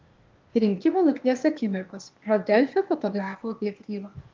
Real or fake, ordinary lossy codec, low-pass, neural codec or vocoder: fake; Opus, 32 kbps; 7.2 kHz; codec, 16 kHz, 0.8 kbps, ZipCodec